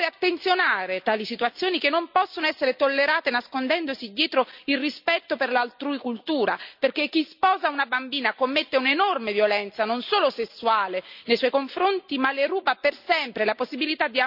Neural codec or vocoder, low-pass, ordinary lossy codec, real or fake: none; 5.4 kHz; none; real